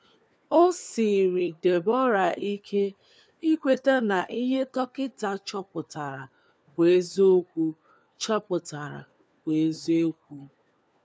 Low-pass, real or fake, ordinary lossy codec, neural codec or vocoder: none; fake; none; codec, 16 kHz, 4 kbps, FunCodec, trained on LibriTTS, 50 frames a second